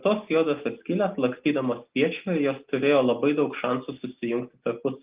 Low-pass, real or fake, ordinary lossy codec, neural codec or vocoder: 3.6 kHz; real; Opus, 32 kbps; none